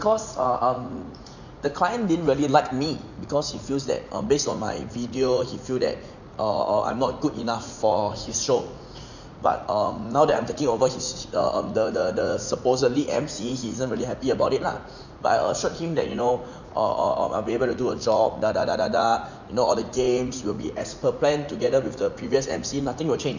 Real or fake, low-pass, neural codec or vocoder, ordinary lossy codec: fake; 7.2 kHz; vocoder, 44.1 kHz, 80 mel bands, Vocos; none